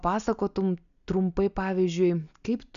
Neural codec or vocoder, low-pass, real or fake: none; 7.2 kHz; real